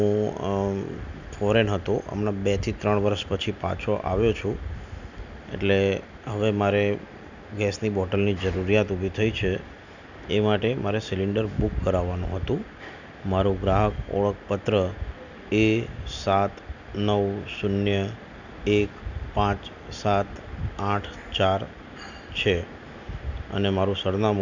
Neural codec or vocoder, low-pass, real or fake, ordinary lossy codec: none; 7.2 kHz; real; none